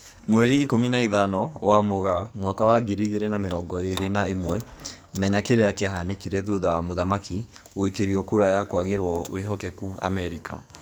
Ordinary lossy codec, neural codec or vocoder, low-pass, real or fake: none; codec, 44.1 kHz, 2.6 kbps, SNAC; none; fake